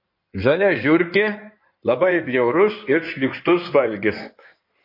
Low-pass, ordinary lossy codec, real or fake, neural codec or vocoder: 5.4 kHz; MP3, 32 kbps; fake; codec, 16 kHz in and 24 kHz out, 2.2 kbps, FireRedTTS-2 codec